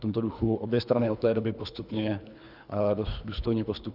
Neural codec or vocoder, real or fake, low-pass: codec, 24 kHz, 3 kbps, HILCodec; fake; 5.4 kHz